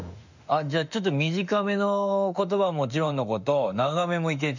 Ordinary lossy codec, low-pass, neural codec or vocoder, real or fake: none; 7.2 kHz; autoencoder, 48 kHz, 32 numbers a frame, DAC-VAE, trained on Japanese speech; fake